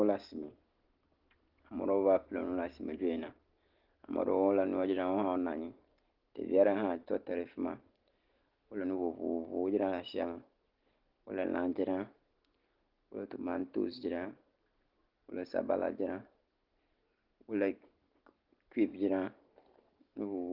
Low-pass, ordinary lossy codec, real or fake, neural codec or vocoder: 5.4 kHz; Opus, 16 kbps; real; none